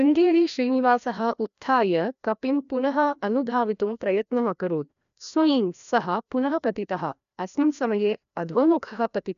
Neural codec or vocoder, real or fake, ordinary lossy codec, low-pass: codec, 16 kHz, 1 kbps, FreqCodec, larger model; fake; none; 7.2 kHz